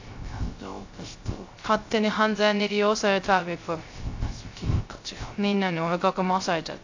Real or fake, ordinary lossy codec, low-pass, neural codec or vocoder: fake; AAC, 48 kbps; 7.2 kHz; codec, 16 kHz, 0.3 kbps, FocalCodec